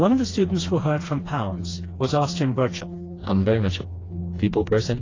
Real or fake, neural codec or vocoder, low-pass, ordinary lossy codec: fake; codec, 16 kHz, 2 kbps, FreqCodec, smaller model; 7.2 kHz; AAC, 32 kbps